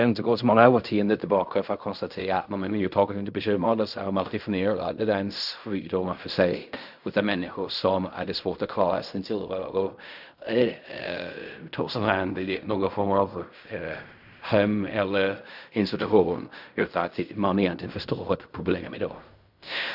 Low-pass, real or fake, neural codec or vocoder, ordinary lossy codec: 5.4 kHz; fake; codec, 16 kHz in and 24 kHz out, 0.4 kbps, LongCat-Audio-Codec, fine tuned four codebook decoder; none